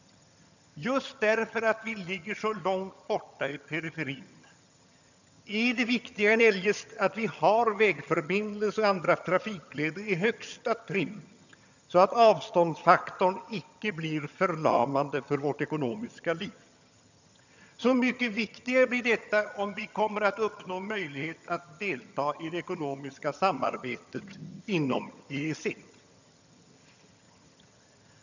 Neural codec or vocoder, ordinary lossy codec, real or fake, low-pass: vocoder, 22.05 kHz, 80 mel bands, HiFi-GAN; none; fake; 7.2 kHz